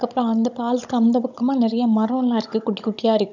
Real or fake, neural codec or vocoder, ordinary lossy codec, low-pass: fake; codec, 16 kHz, 16 kbps, FunCodec, trained on Chinese and English, 50 frames a second; none; 7.2 kHz